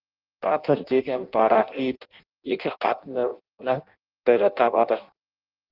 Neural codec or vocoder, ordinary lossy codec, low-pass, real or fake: codec, 16 kHz in and 24 kHz out, 0.6 kbps, FireRedTTS-2 codec; Opus, 24 kbps; 5.4 kHz; fake